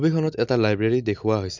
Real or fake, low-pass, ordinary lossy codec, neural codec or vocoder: real; 7.2 kHz; MP3, 64 kbps; none